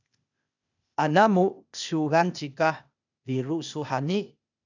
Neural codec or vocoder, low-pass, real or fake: codec, 16 kHz, 0.8 kbps, ZipCodec; 7.2 kHz; fake